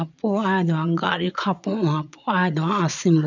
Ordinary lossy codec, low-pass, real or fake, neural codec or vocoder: none; 7.2 kHz; fake; vocoder, 22.05 kHz, 80 mel bands, Vocos